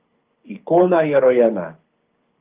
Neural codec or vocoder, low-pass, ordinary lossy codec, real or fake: codec, 24 kHz, 6 kbps, HILCodec; 3.6 kHz; Opus, 32 kbps; fake